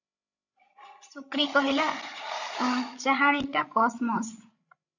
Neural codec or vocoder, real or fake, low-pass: codec, 16 kHz, 16 kbps, FreqCodec, larger model; fake; 7.2 kHz